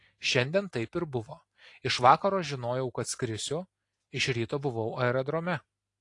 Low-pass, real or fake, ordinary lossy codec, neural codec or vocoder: 10.8 kHz; real; AAC, 48 kbps; none